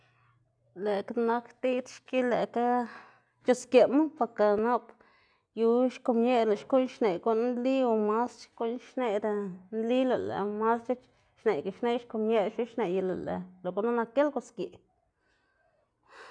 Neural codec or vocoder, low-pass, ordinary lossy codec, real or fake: none; 9.9 kHz; none; real